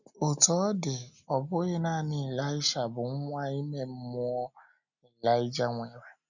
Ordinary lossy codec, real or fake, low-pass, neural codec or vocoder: none; real; 7.2 kHz; none